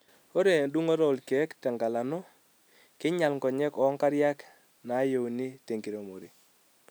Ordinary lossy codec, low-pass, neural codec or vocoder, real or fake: none; none; none; real